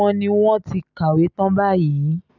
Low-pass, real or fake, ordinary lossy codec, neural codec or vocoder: 7.2 kHz; real; none; none